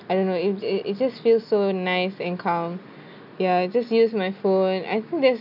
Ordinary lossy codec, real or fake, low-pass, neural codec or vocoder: none; real; 5.4 kHz; none